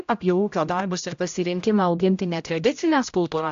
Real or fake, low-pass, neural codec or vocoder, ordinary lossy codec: fake; 7.2 kHz; codec, 16 kHz, 0.5 kbps, X-Codec, HuBERT features, trained on general audio; AAC, 64 kbps